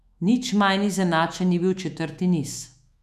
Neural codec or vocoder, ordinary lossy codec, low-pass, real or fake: autoencoder, 48 kHz, 128 numbers a frame, DAC-VAE, trained on Japanese speech; none; 14.4 kHz; fake